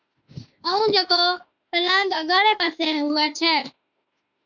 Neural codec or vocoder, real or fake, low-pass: autoencoder, 48 kHz, 32 numbers a frame, DAC-VAE, trained on Japanese speech; fake; 7.2 kHz